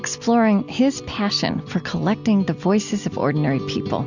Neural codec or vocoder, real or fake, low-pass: none; real; 7.2 kHz